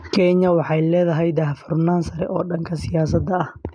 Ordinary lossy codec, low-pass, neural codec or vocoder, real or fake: none; 9.9 kHz; none; real